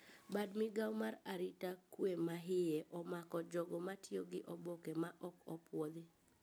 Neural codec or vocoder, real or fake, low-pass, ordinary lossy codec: none; real; none; none